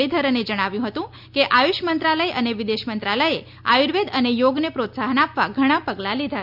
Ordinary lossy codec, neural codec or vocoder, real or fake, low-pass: none; none; real; 5.4 kHz